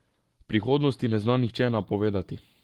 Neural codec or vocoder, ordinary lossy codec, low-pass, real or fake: codec, 44.1 kHz, 7.8 kbps, Pupu-Codec; Opus, 24 kbps; 19.8 kHz; fake